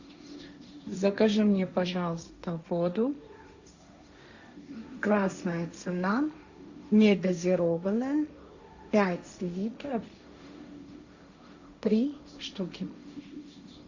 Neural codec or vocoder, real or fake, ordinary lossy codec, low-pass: codec, 16 kHz, 1.1 kbps, Voila-Tokenizer; fake; Opus, 64 kbps; 7.2 kHz